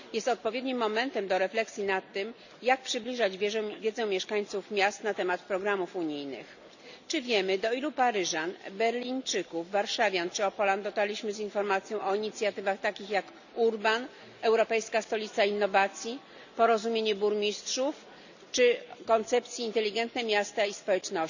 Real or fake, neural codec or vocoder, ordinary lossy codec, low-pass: real; none; none; 7.2 kHz